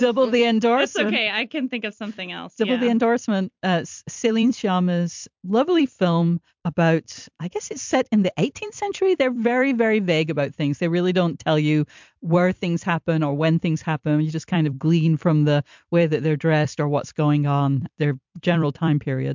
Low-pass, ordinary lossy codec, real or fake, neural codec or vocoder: 7.2 kHz; MP3, 64 kbps; fake; vocoder, 44.1 kHz, 128 mel bands every 256 samples, BigVGAN v2